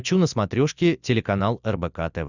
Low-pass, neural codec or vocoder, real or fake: 7.2 kHz; none; real